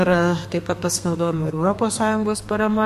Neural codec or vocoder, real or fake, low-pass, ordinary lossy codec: codec, 32 kHz, 1.9 kbps, SNAC; fake; 14.4 kHz; MP3, 64 kbps